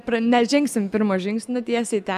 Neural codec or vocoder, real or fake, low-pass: none; real; 14.4 kHz